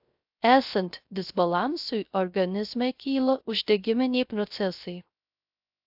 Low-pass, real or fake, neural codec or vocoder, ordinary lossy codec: 5.4 kHz; fake; codec, 16 kHz, 0.3 kbps, FocalCodec; AAC, 48 kbps